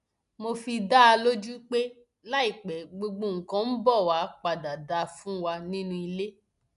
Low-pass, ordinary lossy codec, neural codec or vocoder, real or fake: 10.8 kHz; none; none; real